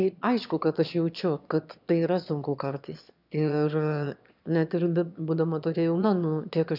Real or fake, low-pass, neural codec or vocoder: fake; 5.4 kHz; autoencoder, 22.05 kHz, a latent of 192 numbers a frame, VITS, trained on one speaker